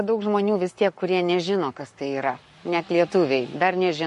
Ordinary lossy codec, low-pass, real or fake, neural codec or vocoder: MP3, 48 kbps; 14.4 kHz; fake; codec, 44.1 kHz, 7.8 kbps, Pupu-Codec